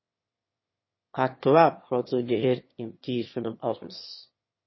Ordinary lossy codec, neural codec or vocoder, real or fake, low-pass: MP3, 24 kbps; autoencoder, 22.05 kHz, a latent of 192 numbers a frame, VITS, trained on one speaker; fake; 7.2 kHz